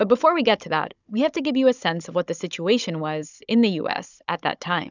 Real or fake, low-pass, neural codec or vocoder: fake; 7.2 kHz; codec, 16 kHz, 16 kbps, FunCodec, trained on Chinese and English, 50 frames a second